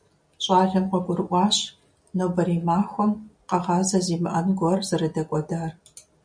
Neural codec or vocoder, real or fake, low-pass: none; real; 9.9 kHz